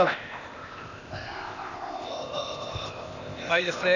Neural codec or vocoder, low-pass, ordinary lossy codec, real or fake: codec, 16 kHz, 0.8 kbps, ZipCodec; 7.2 kHz; none; fake